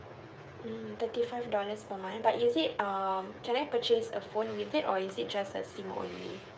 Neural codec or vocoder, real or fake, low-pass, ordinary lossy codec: codec, 16 kHz, 8 kbps, FreqCodec, smaller model; fake; none; none